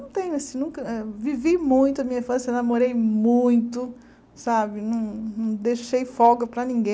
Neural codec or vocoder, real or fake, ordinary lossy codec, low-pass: none; real; none; none